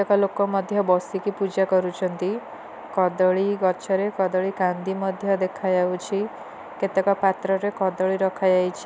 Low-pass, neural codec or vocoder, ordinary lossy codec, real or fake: none; none; none; real